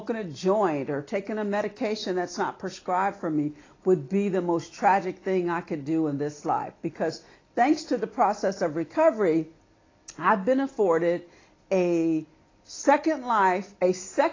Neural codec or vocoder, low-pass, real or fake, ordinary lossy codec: none; 7.2 kHz; real; AAC, 32 kbps